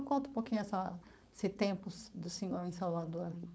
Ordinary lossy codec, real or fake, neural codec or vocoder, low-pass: none; fake; codec, 16 kHz, 4.8 kbps, FACodec; none